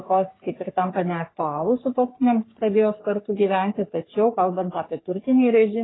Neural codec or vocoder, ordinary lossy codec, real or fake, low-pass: codec, 44.1 kHz, 3.4 kbps, Pupu-Codec; AAC, 16 kbps; fake; 7.2 kHz